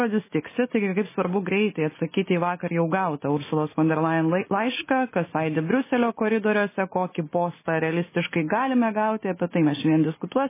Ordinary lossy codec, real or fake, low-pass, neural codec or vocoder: MP3, 16 kbps; real; 3.6 kHz; none